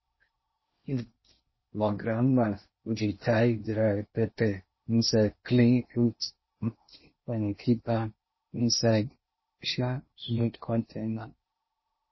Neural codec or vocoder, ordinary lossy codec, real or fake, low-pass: codec, 16 kHz in and 24 kHz out, 0.6 kbps, FocalCodec, streaming, 4096 codes; MP3, 24 kbps; fake; 7.2 kHz